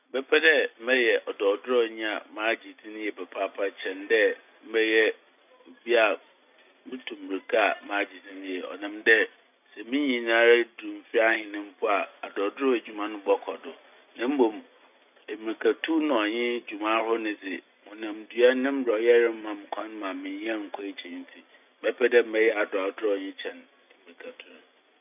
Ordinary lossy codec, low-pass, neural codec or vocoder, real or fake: none; 3.6 kHz; none; real